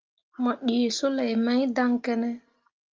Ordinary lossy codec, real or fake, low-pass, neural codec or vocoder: Opus, 24 kbps; real; 7.2 kHz; none